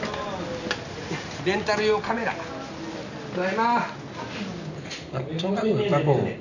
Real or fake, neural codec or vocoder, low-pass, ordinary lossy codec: real; none; 7.2 kHz; none